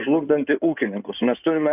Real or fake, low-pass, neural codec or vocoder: fake; 3.6 kHz; codec, 16 kHz in and 24 kHz out, 2.2 kbps, FireRedTTS-2 codec